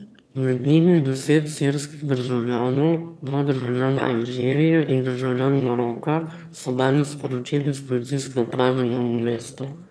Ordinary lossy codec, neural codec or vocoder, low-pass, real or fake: none; autoencoder, 22.05 kHz, a latent of 192 numbers a frame, VITS, trained on one speaker; none; fake